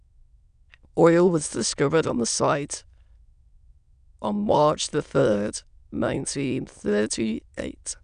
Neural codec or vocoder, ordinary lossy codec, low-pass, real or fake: autoencoder, 22.05 kHz, a latent of 192 numbers a frame, VITS, trained on many speakers; none; 9.9 kHz; fake